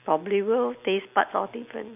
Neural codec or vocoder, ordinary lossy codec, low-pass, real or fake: none; none; 3.6 kHz; real